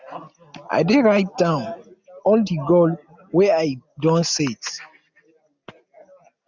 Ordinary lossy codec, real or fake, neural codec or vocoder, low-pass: none; real; none; 7.2 kHz